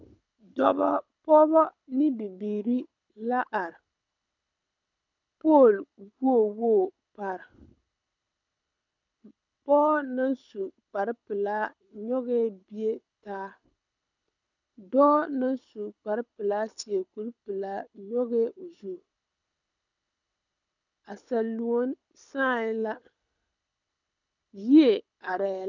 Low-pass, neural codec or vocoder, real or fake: 7.2 kHz; codec, 24 kHz, 6 kbps, HILCodec; fake